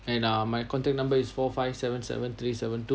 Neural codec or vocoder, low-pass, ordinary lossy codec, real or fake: none; none; none; real